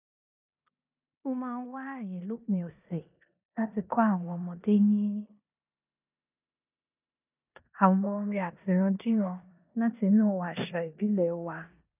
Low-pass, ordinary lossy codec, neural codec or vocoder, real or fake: 3.6 kHz; none; codec, 16 kHz in and 24 kHz out, 0.9 kbps, LongCat-Audio-Codec, four codebook decoder; fake